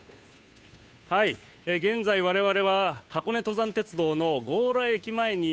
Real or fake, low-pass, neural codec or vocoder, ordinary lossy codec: fake; none; codec, 16 kHz, 2 kbps, FunCodec, trained on Chinese and English, 25 frames a second; none